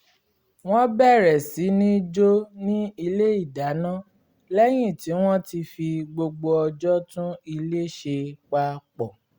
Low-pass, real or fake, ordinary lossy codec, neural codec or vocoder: 19.8 kHz; real; Opus, 64 kbps; none